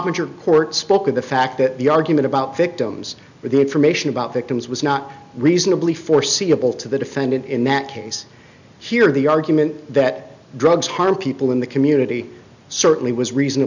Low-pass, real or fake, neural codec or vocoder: 7.2 kHz; real; none